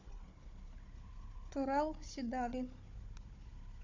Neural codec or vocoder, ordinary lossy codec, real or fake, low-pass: codec, 16 kHz, 16 kbps, FunCodec, trained on Chinese and English, 50 frames a second; MP3, 48 kbps; fake; 7.2 kHz